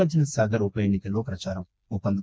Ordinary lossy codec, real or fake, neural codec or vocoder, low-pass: none; fake; codec, 16 kHz, 2 kbps, FreqCodec, smaller model; none